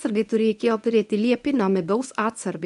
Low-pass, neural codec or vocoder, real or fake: 10.8 kHz; codec, 24 kHz, 0.9 kbps, WavTokenizer, medium speech release version 1; fake